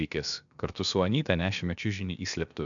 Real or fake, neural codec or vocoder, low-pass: fake; codec, 16 kHz, about 1 kbps, DyCAST, with the encoder's durations; 7.2 kHz